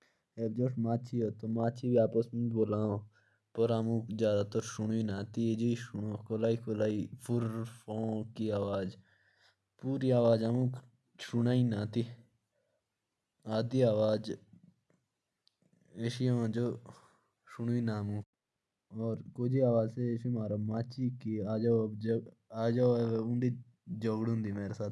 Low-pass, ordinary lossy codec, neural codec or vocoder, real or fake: none; none; none; real